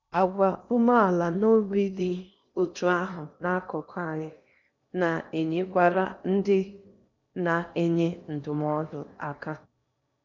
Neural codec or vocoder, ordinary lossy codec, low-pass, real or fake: codec, 16 kHz in and 24 kHz out, 0.8 kbps, FocalCodec, streaming, 65536 codes; none; 7.2 kHz; fake